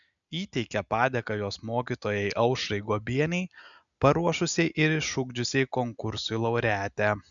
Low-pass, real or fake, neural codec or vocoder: 7.2 kHz; real; none